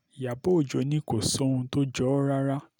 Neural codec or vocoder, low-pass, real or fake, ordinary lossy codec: none; 19.8 kHz; real; none